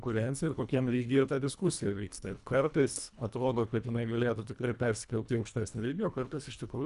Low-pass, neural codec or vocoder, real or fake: 10.8 kHz; codec, 24 kHz, 1.5 kbps, HILCodec; fake